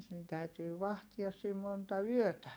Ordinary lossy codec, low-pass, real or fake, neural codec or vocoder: none; none; fake; codec, 44.1 kHz, 7.8 kbps, DAC